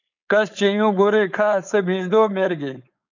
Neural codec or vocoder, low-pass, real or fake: codec, 16 kHz, 4.8 kbps, FACodec; 7.2 kHz; fake